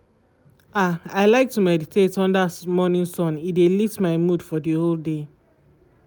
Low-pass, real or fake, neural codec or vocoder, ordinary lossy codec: none; real; none; none